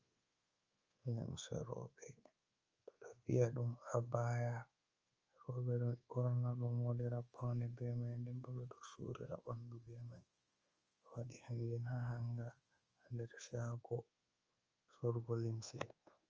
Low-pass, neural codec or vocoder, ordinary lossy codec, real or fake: 7.2 kHz; codec, 24 kHz, 1.2 kbps, DualCodec; Opus, 24 kbps; fake